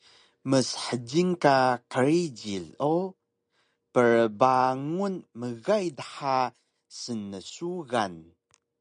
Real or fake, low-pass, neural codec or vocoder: real; 9.9 kHz; none